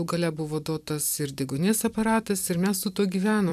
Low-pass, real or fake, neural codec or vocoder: 14.4 kHz; fake; vocoder, 44.1 kHz, 128 mel bands every 256 samples, BigVGAN v2